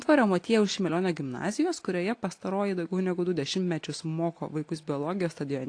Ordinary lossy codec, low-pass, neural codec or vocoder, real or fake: AAC, 48 kbps; 9.9 kHz; none; real